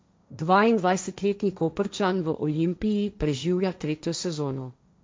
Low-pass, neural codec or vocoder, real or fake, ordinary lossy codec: none; codec, 16 kHz, 1.1 kbps, Voila-Tokenizer; fake; none